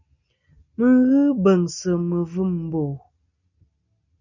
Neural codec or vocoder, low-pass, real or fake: none; 7.2 kHz; real